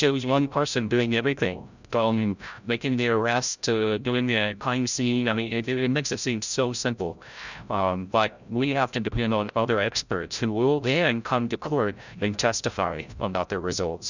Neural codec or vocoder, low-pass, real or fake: codec, 16 kHz, 0.5 kbps, FreqCodec, larger model; 7.2 kHz; fake